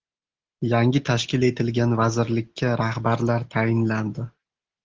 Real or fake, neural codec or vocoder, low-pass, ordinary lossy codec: real; none; 7.2 kHz; Opus, 16 kbps